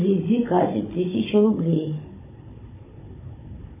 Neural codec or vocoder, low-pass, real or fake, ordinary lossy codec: codec, 16 kHz, 16 kbps, FunCodec, trained on Chinese and English, 50 frames a second; 3.6 kHz; fake; MP3, 16 kbps